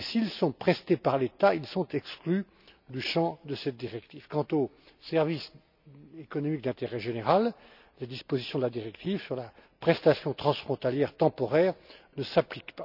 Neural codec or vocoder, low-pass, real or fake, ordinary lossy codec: none; 5.4 kHz; real; none